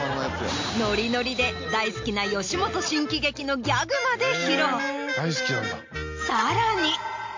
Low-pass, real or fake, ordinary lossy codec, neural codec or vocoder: 7.2 kHz; real; MP3, 48 kbps; none